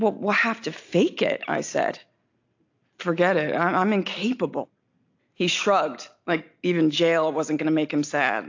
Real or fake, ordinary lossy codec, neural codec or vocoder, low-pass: real; AAC, 48 kbps; none; 7.2 kHz